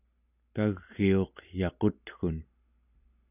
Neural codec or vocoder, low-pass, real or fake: none; 3.6 kHz; real